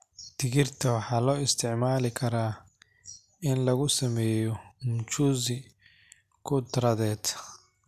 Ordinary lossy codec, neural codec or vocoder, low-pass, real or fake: MP3, 96 kbps; none; 14.4 kHz; real